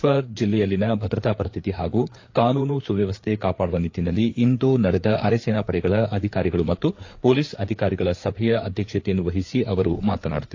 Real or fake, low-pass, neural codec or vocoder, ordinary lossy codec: fake; 7.2 kHz; codec, 16 kHz, 4 kbps, FreqCodec, larger model; AAC, 48 kbps